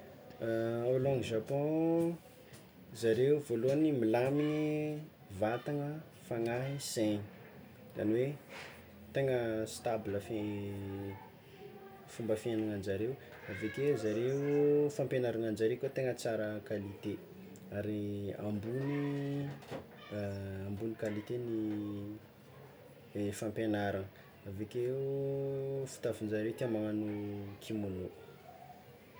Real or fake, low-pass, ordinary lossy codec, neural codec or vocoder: real; none; none; none